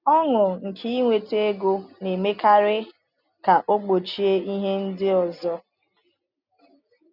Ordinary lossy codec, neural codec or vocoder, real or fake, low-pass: none; none; real; 5.4 kHz